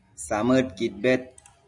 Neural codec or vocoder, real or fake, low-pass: none; real; 10.8 kHz